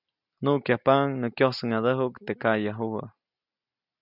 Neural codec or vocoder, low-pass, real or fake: none; 5.4 kHz; real